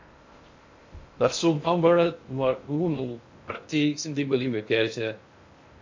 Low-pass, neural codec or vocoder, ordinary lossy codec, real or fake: 7.2 kHz; codec, 16 kHz in and 24 kHz out, 0.6 kbps, FocalCodec, streaming, 2048 codes; MP3, 48 kbps; fake